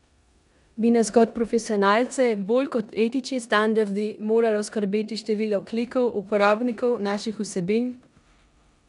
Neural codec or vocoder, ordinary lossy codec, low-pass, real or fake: codec, 16 kHz in and 24 kHz out, 0.9 kbps, LongCat-Audio-Codec, four codebook decoder; none; 10.8 kHz; fake